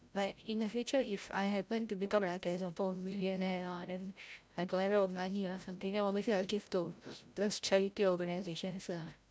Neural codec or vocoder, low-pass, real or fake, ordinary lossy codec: codec, 16 kHz, 0.5 kbps, FreqCodec, larger model; none; fake; none